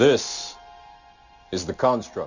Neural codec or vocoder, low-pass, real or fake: none; 7.2 kHz; real